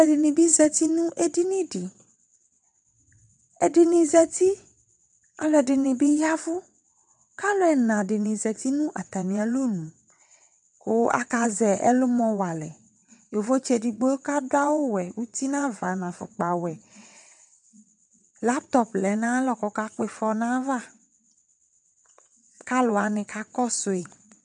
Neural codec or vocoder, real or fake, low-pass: vocoder, 22.05 kHz, 80 mel bands, WaveNeXt; fake; 9.9 kHz